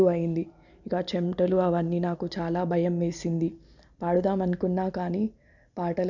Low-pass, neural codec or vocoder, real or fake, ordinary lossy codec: 7.2 kHz; none; real; none